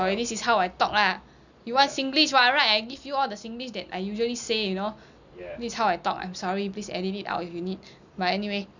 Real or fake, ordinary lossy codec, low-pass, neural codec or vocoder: real; none; 7.2 kHz; none